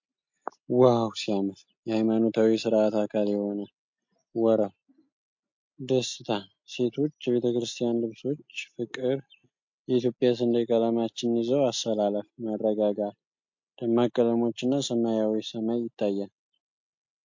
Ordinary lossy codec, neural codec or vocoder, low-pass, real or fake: MP3, 48 kbps; none; 7.2 kHz; real